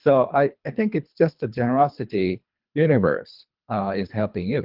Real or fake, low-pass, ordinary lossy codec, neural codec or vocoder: fake; 5.4 kHz; Opus, 24 kbps; codec, 24 kHz, 3 kbps, HILCodec